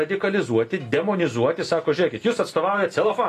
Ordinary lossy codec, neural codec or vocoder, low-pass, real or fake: AAC, 48 kbps; none; 14.4 kHz; real